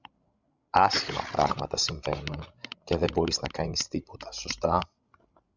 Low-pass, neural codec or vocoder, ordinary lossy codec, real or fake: 7.2 kHz; codec, 16 kHz, 16 kbps, FreqCodec, larger model; Opus, 64 kbps; fake